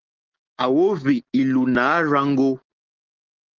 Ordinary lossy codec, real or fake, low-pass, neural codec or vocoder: Opus, 16 kbps; real; 7.2 kHz; none